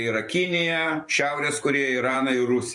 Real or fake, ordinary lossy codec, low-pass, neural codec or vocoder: fake; MP3, 48 kbps; 10.8 kHz; vocoder, 44.1 kHz, 128 mel bands every 512 samples, BigVGAN v2